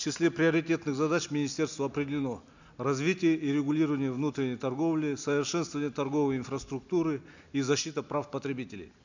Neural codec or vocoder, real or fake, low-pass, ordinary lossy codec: none; real; 7.2 kHz; none